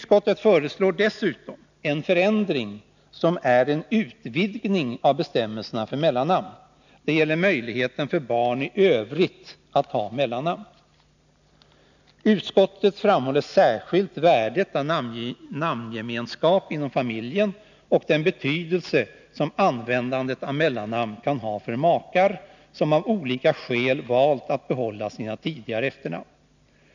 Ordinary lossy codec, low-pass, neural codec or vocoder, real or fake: none; 7.2 kHz; none; real